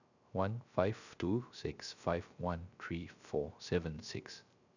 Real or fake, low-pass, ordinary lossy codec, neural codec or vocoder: fake; 7.2 kHz; none; codec, 16 kHz, 0.3 kbps, FocalCodec